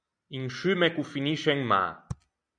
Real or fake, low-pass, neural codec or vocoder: real; 9.9 kHz; none